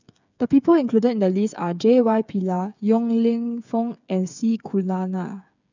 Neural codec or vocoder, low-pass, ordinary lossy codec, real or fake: codec, 16 kHz, 8 kbps, FreqCodec, smaller model; 7.2 kHz; none; fake